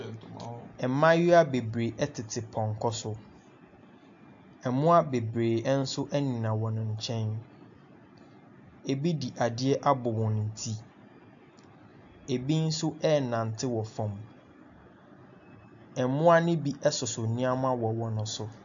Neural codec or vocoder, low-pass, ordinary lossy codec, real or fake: none; 7.2 kHz; AAC, 64 kbps; real